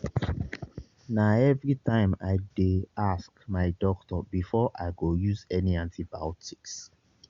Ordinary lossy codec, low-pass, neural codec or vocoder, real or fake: Opus, 64 kbps; 7.2 kHz; none; real